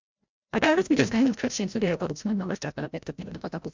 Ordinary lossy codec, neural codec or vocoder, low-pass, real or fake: MP3, 64 kbps; codec, 16 kHz, 0.5 kbps, FreqCodec, larger model; 7.2 kHz; fake